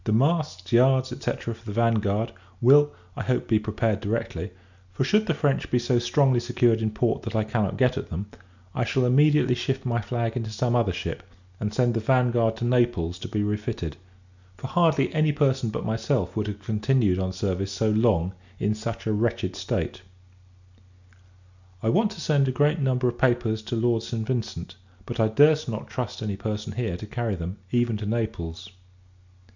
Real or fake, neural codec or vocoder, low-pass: real; none; 7.2 kHz